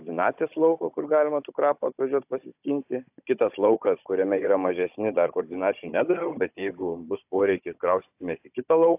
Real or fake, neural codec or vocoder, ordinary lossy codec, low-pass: fake; codec, 16 kHz, 16 kbps, FunCodec, trained on Chinese and English, 50 frames a second; AAC, 32 kbps; 3.6 kHz